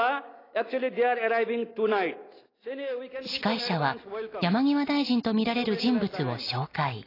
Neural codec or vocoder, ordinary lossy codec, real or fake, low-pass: none; AAC, 24 kbps; real; 5.4 kHz